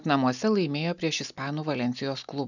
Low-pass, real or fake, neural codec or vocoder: 7.2 kHz; real; none